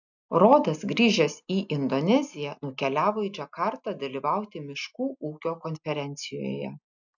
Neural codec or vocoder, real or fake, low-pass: none; real; 7.2 kHz